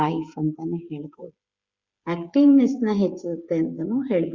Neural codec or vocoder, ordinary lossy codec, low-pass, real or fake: codec, 16 kHz, 8 kbps, FreqCodec, smaller model; Opus, 64 kbps; 7.2 kHz; fake